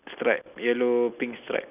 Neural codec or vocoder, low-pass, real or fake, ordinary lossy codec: none; 3.6 kHz; real; none